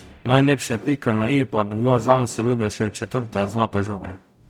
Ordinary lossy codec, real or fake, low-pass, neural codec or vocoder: none; fake; 19.8 kHz; codec, 44.1 kHz, 0.9 kbps, DAC